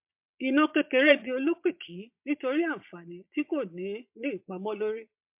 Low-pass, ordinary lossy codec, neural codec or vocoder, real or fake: 3.6 kHz; MP3, 32 kbps; vocoder, 22.05 kHz, 80 mel bands, Vocos; fake